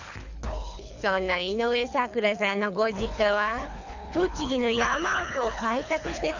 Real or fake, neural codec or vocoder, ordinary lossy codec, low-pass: fake; codec, 24 kHz, 3 kbps, HILCodec; none; 7.2 kHz